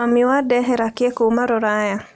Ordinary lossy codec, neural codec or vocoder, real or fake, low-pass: none; none; real; none